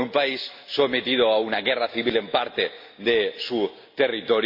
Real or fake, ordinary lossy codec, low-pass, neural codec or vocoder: real; MP3, 32 kbps; 5.4 kHz; none